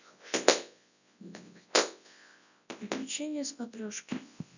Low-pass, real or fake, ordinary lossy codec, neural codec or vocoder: 7.2 kHz; fake; none; codec, 24 kHz, 0.9 kbps, WavTokenizer, large speech release